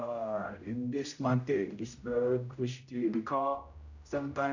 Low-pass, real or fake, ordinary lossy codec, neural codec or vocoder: 7.2 kHz; fake; none; codec, 16 kHz, 0.5 kbps, X-Codec, HuBERT features, trained on general audio